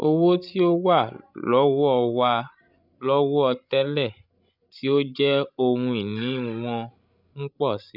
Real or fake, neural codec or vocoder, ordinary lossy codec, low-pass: fake; codec, 16 kHz, 16 kbps, FreqCodec, larger model; none; 5.4 kHz